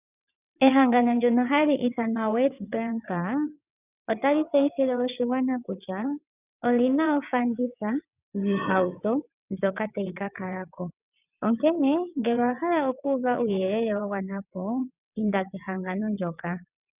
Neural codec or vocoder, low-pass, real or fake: vocoder, 22.05 kHz, 80 mel bands, WaveNeXt; 3.6 kHz; fake